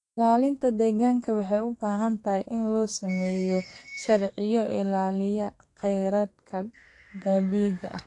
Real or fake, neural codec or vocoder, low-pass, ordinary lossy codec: fake; codec, 32 kHz, 1.9 kbps, SNAC; 10.8 kHz; AAC, 48 kbps